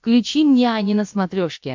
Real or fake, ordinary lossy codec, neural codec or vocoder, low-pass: fake; MP3, 48 kbps; codec, 16 kHz, about 1 kbps, DyCAST, with the encoder's durations; 7.2 kHz